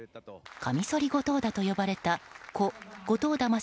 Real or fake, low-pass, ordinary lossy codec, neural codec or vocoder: real; none; none; none